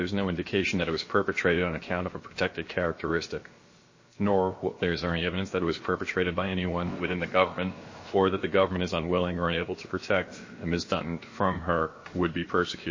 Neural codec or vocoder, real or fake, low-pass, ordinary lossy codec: codec, 16 kHz, about 1 kbps, DyCAST, with the encoder's durations; fake; 7.2 kHz; MP3, 32 kbps